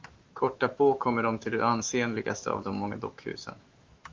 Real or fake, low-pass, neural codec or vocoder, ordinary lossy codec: fake; 7.2 kHz; autoencoder, 48 kHz, 128 numbers a frame, DAC-VAE, trained on Japanese speech; Opus, 24 kbps